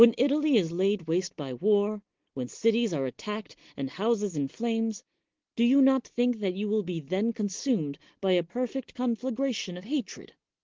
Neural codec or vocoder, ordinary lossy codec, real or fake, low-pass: none; Opus, 16 kbps; real; 7.2 kHz